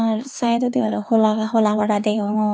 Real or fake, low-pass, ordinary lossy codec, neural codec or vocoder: fake; none; none; codec, 16 kHz, 4 kbps, X-Codec, HuBERT features, trained on LibriSpeech